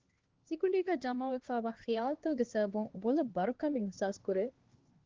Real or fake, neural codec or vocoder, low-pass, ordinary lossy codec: fake; codec, 16 kHz, 2 kbps, X-Codec, HuBERT features, trained on LibriSpeech; 7.2 kHz; Opus, 16 kbps